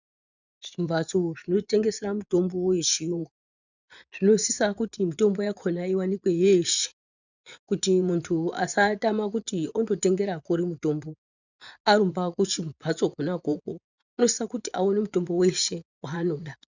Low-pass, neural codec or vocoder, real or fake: 7.2 kHz; vocoder, 24 kHz, 100 mel bands, Vocos; fake